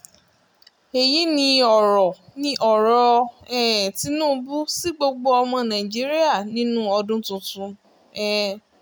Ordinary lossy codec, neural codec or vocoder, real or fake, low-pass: none; none; real; none